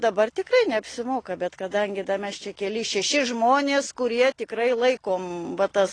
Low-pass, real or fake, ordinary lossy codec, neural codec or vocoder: 9.9 kHz; real; AAC, 32 kbps; none